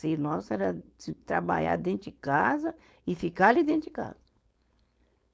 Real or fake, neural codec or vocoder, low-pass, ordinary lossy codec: fake; codec, 16 kHz, 4.8 kbps, FACodec; none; none